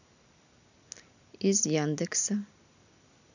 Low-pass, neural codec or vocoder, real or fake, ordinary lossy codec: 7.2 kHz; none; real; none